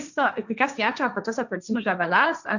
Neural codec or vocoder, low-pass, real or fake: codec, 16 kHz, 1.1 kbps, Voila-Tokenizer; 7.2 kHz; fake